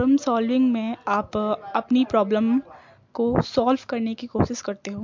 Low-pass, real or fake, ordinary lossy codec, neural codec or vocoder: 7.2 kHz; real; MP3, 48 kbps; none